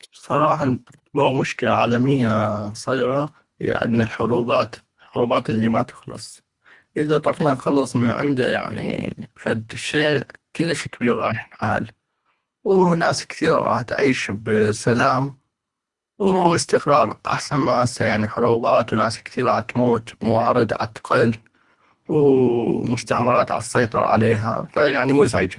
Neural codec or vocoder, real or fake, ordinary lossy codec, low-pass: codec, 24 kHz, 1.5 kbps, HILCodec; fake; Opus, 64 kbps; 10.8 kHz